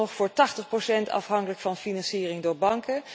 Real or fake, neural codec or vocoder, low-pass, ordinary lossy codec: real; none; none; none